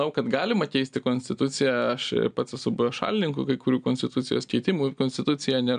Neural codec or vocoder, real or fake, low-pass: none; real; 9.9 kHz